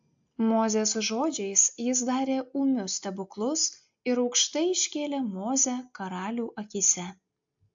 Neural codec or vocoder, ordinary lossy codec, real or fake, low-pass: none; AAC, 64 kbps; real; 7.2 kHz